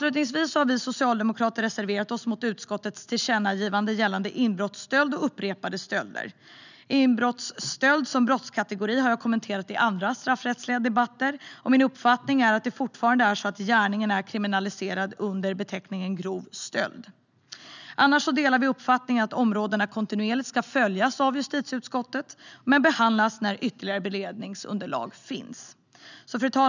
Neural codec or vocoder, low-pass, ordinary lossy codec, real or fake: none; 7.2 kHz; none; real